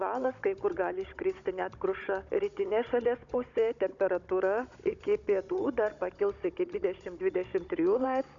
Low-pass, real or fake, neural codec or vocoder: 7.2 kHz; fake; codec, 16 kHz, 16 kbps, FunCodec, trained on Chinese and English, 50 frames a second